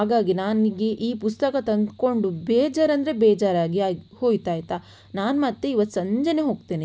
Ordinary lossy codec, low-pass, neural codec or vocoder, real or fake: none; none; none; real